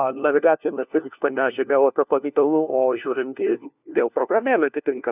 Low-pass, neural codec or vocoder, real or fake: 3.6 kHz; codec, 16 kHz, 1 kbps, FunCodec, trained on LibriTTS, 50 frames a second; fake